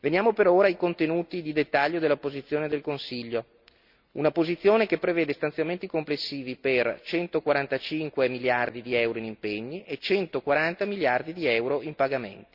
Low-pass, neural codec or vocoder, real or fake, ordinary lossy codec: 5.4 kHz; none; real; Opus, 64 kbps